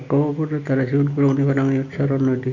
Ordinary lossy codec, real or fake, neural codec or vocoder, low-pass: none; real; none; 7.2 kHz